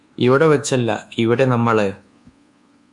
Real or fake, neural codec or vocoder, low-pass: fake; codec, 24 kHz, 1.2 kbps, DualCodec; 10.8 kHz